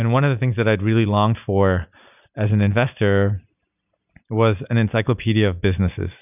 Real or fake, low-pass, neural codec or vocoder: real; 3.6 kHz; none